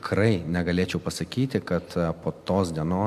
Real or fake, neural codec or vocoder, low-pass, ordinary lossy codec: real; none; 14.4 kHz; MP3, 96 kbps